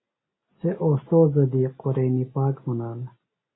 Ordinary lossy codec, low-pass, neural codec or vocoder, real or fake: AAC, 16 kbps; 7.2 kHz; none; real